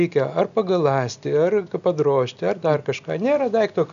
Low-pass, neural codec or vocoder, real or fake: 7.2 kHz; none; real